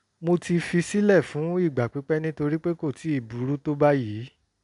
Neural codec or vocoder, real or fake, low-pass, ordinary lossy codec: none; real; 10.8 kHz; none